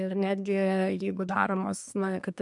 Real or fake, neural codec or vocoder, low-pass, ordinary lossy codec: fake; codec, 24 kHz, 1 kbps, SNAC; 10.8 kHz; MP3, 96 kbps